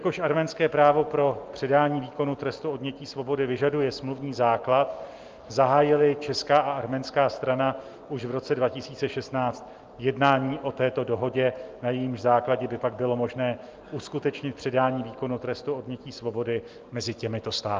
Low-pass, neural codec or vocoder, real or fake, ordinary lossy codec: 7.2 kHz; none; real; Opus, 24 kbps